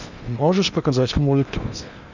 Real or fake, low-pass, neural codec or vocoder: fake; 7.2 kHz; codec, 16 kHz in and 24 kHz out, 0.8 kbps, FocalCodec, streaming, 65536 codes